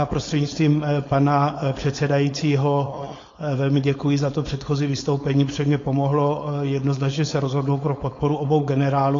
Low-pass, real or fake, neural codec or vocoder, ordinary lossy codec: 7.2 kHz; fake; codec, 16 kHz, 4.8 kbps, FACodec; AAC, 32 kbps